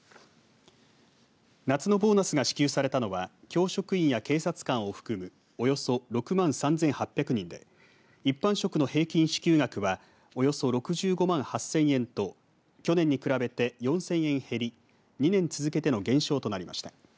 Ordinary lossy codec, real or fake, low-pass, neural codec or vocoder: none; real; none; none